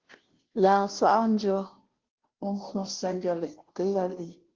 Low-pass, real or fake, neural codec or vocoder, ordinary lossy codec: 7.2 kHz; fake; codec, 16 kHz, 0.5 kbps, FunCodec, trained on Chinese and English, 25 frames a second; Opus, 16 kbps